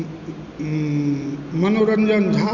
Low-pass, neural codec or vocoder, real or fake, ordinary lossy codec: 7.2 kHz; none; real; Opus, 64 kbps